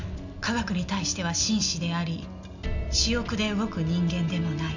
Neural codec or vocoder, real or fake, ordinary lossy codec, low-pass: none; real; none; 7.2 kHz